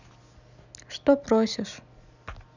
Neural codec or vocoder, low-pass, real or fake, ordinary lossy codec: none; 7.2 kHz; real; none